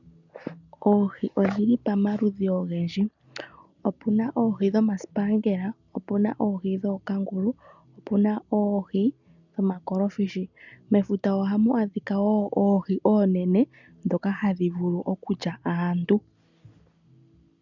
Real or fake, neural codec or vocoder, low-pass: real; none; 7.2 kHz